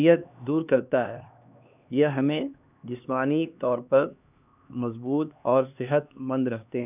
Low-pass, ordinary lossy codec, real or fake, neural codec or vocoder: 3.6 kHz; none; fake; codec, 16 kHz, 2 kbps, X-Codec, HuBERT features, trained on LibriSpeech